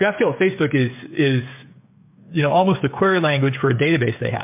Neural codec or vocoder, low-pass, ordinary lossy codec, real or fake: codec, 16 kHz, 8 kbps, FreqCodec, smaller model; 3.6 kHz; MP3, 32 kbps; fake